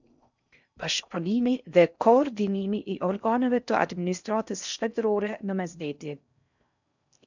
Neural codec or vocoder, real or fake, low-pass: codec, 16 kHz in and 24 kHz out, 0.6 kbps, FocalCodec, streaming, 4096 codes; fake; 7.2 kHz